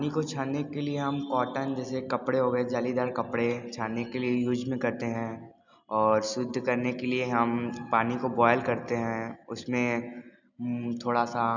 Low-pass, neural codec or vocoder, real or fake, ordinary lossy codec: 7.2 kHz; none; real; none